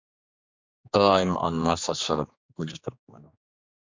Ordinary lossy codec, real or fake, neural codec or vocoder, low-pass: MP3, 64 kbps; fake; codec, 16 kHz, 2 kbps, X-Codec, HuBERT features, trained on general audio; 7.2 kHz